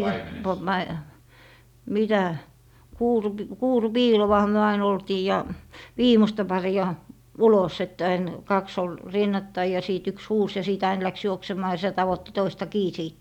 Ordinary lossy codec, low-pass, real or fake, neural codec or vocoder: none; 19.8 kHz; real; none